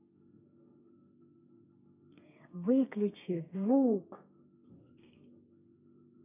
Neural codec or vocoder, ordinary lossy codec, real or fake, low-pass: codec, 32 kHz, 1.9 kbps, SNAC; none; fake; 3.6 kHz